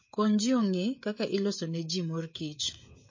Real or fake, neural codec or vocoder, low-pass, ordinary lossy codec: fake; codec, 16 kHz, 16 kbps, FreqCodec, smaller model; 7.2 kHz; MP3, 32 kbps